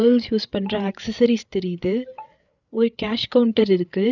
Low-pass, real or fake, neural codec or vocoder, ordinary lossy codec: 7.2 kHz; fake; codec, 16 kHz, 8 kbps, FreqCodec, larger model; none